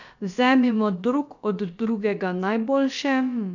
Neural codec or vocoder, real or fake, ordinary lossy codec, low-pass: codec, 16 kHz, about 1 kbps, DyCAST, with the encoder's durations; fake; none; 7.2 kHz